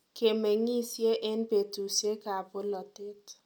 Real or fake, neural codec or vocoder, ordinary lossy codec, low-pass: real; none; none; 19.8 kHz